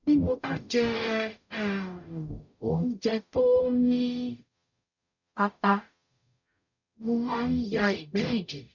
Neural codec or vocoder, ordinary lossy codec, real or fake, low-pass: codec, 44.1 kHz, 0.9 kbps, DAC; none; fake; 7.2 kHz